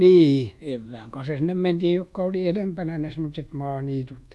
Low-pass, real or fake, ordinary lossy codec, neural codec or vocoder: none; fake; none; codec, 24 kHz, 1.2 kbps, DualCodec